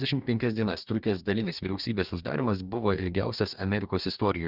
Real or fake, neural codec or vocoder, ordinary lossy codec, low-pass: fake; codec, 44.1 kHz, 2.6 kbps, DAC; Opus, 64 kbps; 5.4 kHz